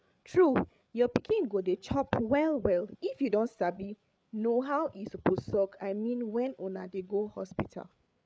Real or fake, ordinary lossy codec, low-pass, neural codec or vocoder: fake; none; none; codec, 16 kHz, 8 kbps, FreqCodec, larger model